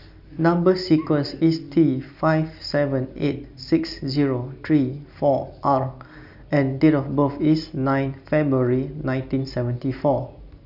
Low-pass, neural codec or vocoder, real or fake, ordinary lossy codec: 5.4 kHz; none; real; none